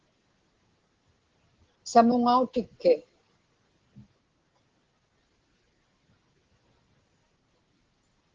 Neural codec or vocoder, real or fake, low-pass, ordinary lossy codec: none; real; 7.2 kHz; Opus, 16 kbps